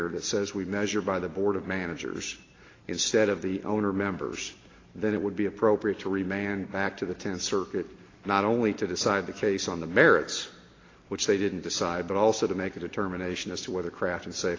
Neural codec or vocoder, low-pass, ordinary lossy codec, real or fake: none; 7.2 kHz; AAC, 32 kbps; real